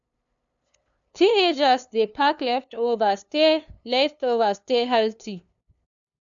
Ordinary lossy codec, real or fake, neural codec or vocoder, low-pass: none; fake; codec, 16 kHz, 2 kbps, FunCodec, trained on LibriTTS, 25 frames a second; 7.2 kHz